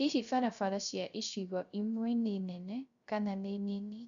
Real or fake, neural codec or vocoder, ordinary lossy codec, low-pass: fake; codec, 16 kHz, 0.3 kbps, FocalCodec; MP3, 96 kbps; 7.2 kHz